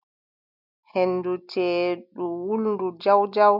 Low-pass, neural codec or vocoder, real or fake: 5.4 kHz; none; real